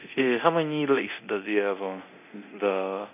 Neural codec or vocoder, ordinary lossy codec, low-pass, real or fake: codec, 24 kHz, 0.9 kbps, DualCodec; none; 3.6 kHz; fake